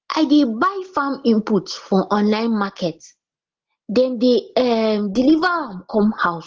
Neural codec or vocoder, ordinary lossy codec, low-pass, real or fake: none; Opus, 16 kbps; 7.2 kHz; real